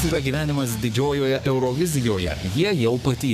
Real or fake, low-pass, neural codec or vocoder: fake; 14.4 kHz; codec, 32 kHz, 1.9 kbps, SNAC